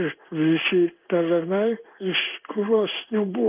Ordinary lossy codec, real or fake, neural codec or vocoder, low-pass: Opus, 24 kbps; fake; codec, 16 kHz in and 24 kHz out, 1 kbps, XY-Tokenizer; 3.6 kHz